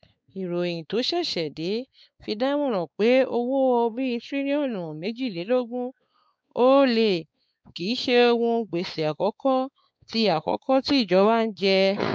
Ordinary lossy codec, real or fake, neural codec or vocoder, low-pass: none; fake; codec, 16 kHz, 4 kbps, X-Codec, WavLM features, trained on Multilingual LibriSpeech; none